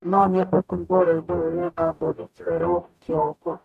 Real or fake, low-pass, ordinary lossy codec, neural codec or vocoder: fake; 14.4 kHz; none; codec, 44.1 kHz, 0.9 kbps, DAC